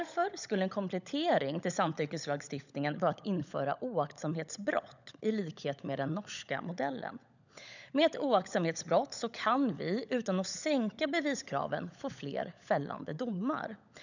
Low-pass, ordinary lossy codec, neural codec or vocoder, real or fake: 7.2 kHz; none; codec, 16 kHz, 16 kbps, FunCodec, trained on LibriTTS, 50 frames a second; fake